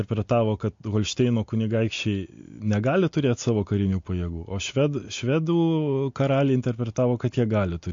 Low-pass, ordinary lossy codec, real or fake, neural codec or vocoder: 7.2 kHz; MP3, 48 kbps; real; none